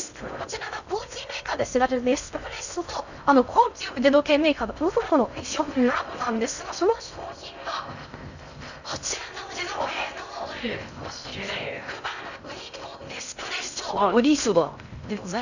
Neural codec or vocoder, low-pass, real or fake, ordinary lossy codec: codec, 16 kHz in and 24 kHz out, 0.6 kbps, FocalCodec, streaming, 2048 codes; 7.2 kHz; fake; none